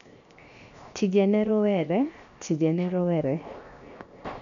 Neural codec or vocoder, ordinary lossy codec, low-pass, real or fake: codec, 16 kHz, 0.7 kbps, FocalCodec; none; 7.2 kHz; fake